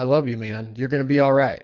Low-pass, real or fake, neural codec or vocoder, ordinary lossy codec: 7.2 kHz; fake; codec, 24 kHz, 3 kbps, HILCodec; MP3, 48 kbps